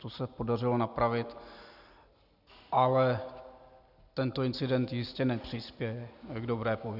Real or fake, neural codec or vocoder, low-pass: real; none; 5.4 kHz